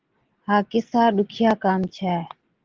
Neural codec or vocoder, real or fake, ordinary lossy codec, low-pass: none; real; Opus, 16 kbps; 7.2 kHz